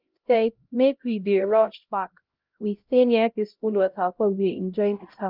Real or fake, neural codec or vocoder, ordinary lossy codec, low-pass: fake; codec, 16 kHz, 0.5 kbps, X-Codec, HuBERT features, trained on LibriSpeech; Opus, 24 kbps; 5.4 kHz